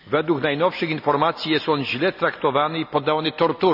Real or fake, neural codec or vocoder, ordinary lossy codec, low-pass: real; none; none; 5.4 kHz